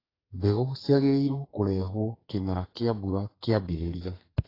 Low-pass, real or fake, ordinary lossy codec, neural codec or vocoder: 5.4 kHz; fake; AAC, 24 kbps; codec, 32 kHz, 1.9 kbps, SNAC